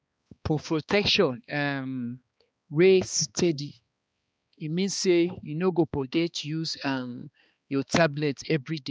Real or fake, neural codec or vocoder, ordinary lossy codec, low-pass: fake; codec, 16 kHz, 2 kbps, X-Codec, HuBERT features, trained on balanced general audio; none; none